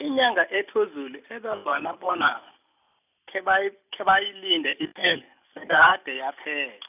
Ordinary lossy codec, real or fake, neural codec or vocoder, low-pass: none; real; none; 3.6 kHz